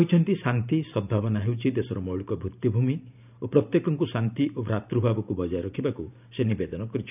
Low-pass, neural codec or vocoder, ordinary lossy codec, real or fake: 3.6 kHz; vocoder, 44.1 kHz, 128 mel bands every 512 samples, BigVGAN v2; none; fake